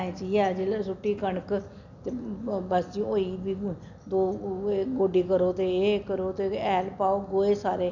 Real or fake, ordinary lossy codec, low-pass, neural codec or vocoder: real; none; 7.2 kHz; none